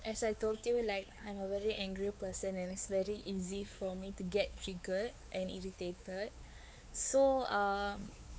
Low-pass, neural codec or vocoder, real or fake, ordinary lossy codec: none; codec, 16 kHz, 4 kbps, X-Codec, WavLM features, trained on Multilingual LibriSpeech; fake; none